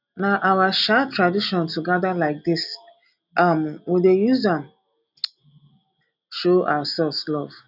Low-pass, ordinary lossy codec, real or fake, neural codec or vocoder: 5.4 kHz; none; real; none